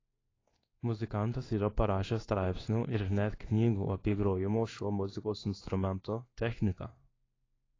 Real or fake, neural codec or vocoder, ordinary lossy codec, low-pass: fake; codec, 16 kHz in and 24 kHz out, 1 kbps, XY-Tokenizer; AAC, 32 kbps; 7.2 kHz